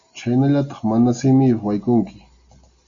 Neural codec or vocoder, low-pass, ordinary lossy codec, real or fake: none; 7.2 kHz; Opus, 64 kbps; real